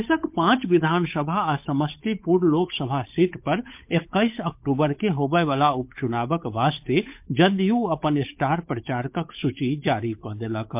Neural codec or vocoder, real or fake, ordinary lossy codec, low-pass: codec, 16 kHz, 8 kbps, FunCodec, trained on Chinese and English, 25 frames a second; fake; MP3, 32 kbps; 3.6 kHz